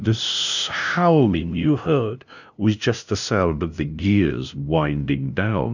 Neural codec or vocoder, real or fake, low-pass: codec, 16 kHz, 0.5 kbps, FunCodec, trained on LibriTTS, 25 frames a second; fake; 7.2 kHz